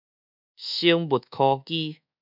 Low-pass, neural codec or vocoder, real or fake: 5.4 kHz; codec, 24 kHz, 1.2 kbps, DualCodec; fake